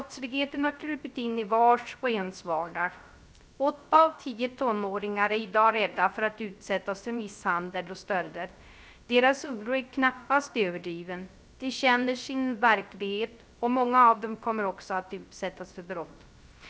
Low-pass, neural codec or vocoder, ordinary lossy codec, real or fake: none; codec, 16 kHz, 0.3 kbps, FocalCodec; none; fake